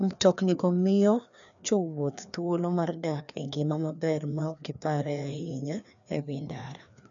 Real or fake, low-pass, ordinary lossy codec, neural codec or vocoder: fake; 7.2 kHz; none; codec, 16 kHz, 2 kbps, FreqCodec, larger model